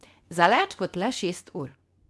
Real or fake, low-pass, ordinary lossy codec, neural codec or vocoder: fake; none; none; codec, 24 kHz, 0.9 kbps, WavTokenizer, medium speech release version 2